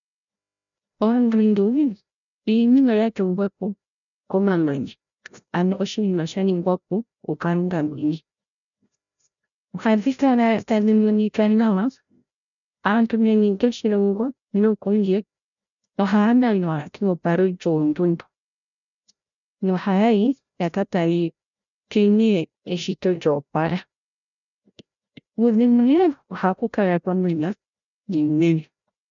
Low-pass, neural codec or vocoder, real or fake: 7.2 kHz; codec, 16 kHz, 0.5 kbps, FreqCodec, larger model; fake